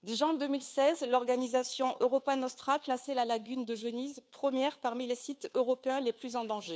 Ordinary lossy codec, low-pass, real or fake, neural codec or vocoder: none; none; fake; codec, 16 kHz, 2 kbps, FunCodec, trained on Chinese and English, 25 frames a second